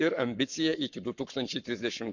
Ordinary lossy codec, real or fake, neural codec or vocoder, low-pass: none; fake; codec, 44.1 kHz, 7.8 kbps, Pupu-Codec; 7.2 kHz